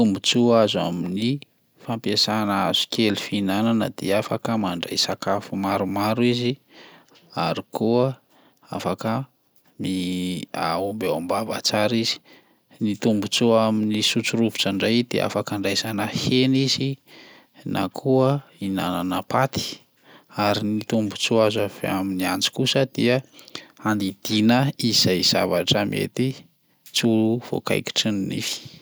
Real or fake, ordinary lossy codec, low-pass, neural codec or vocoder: real; none; none; none